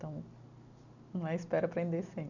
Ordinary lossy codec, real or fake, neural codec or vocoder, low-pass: none; real; none; 7.2 kHz